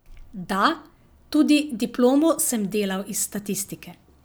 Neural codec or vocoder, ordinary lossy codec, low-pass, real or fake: none; none; none; real